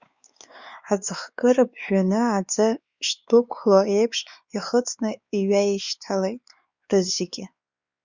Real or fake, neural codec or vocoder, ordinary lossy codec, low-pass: fake; codec, 16 kHz, 4 kbps, X-Codec, WavLM features, trained on Multilingual LibriSpeech; Opus, 64 kbps; 7.2 kHz